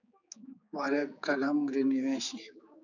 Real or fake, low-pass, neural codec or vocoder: fake; 7.2 kHz; codec, 16 kHz, 4 kbps, X-Codec, HuBERT features, trained on general audio